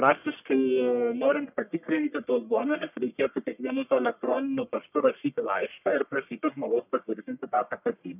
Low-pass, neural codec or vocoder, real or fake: 3.6 kHz; codec, 44.1 kHz, 1.7 kbps, Pupu-Codec; fake